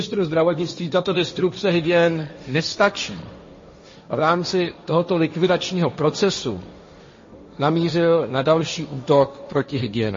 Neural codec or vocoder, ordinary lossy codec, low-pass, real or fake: codec, 16 kHz, 1.1 kbps, Voila-Tokenizer; MP3, 32 kbps; 7.2 kHz; fake